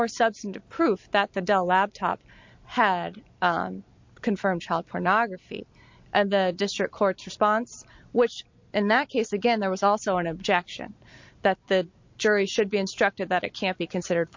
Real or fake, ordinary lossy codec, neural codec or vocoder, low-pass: real; MP3, 48 kbps; none; 7.2 kHz